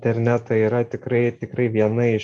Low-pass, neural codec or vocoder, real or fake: 10.8 kHz; none; real